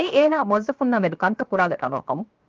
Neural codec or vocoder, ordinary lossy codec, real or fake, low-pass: codec, 16 kHz, 0.7 kbps, FocalCodec; Opus, 24 kbps; fake; 7.2 kHz